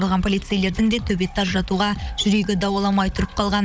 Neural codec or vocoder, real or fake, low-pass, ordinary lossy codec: codec, 16 kHz, 16 kbps, FunCodec, trained on Chinese and English, 50 frames a second; fake; none; none